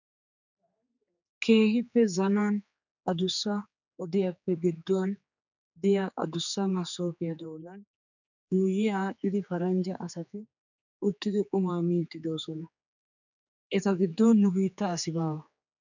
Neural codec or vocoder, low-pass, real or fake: codec, 16 kHz, 4 kbps, X-Codec, HuBERT features, trained on general audio; 7.2 kHz; fake